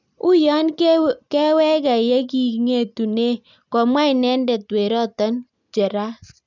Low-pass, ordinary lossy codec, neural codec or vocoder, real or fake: 7.2 kHz; none; none; real